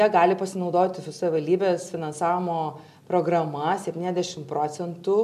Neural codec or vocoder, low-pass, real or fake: none; 14.4 kHz; real